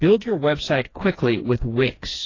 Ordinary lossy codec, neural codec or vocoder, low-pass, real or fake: AAC, 32 kbps; codec, 16 kHz, 2 kbps, FreqCodec, smaller model; 7.2 kHz; fake